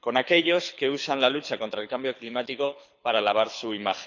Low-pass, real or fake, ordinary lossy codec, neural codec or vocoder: 7.2 kHz; fake; none; codec, 24 kHz, 6 kbps, HILCodec